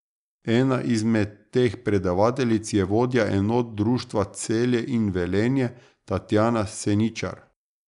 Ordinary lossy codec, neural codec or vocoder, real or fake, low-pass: none; none; real; 10.8 kHz